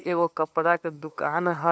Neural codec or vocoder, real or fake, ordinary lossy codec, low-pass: codec, 16 kHz, 2 kbps, FunCodec, trained on LibriTTS, 25 frames a second; fake; none; none